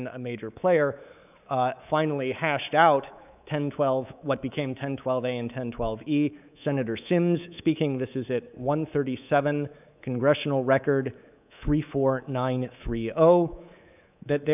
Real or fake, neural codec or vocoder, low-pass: fake; codec, 24 kHz, 3.1 kbps, DualCodec; 3.6 kHz